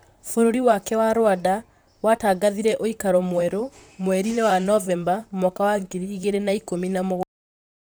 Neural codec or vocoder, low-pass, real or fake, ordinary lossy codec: vocoder, 44.1 kHz, 128 mel bands, Pupu-Vocoder; none; fake; none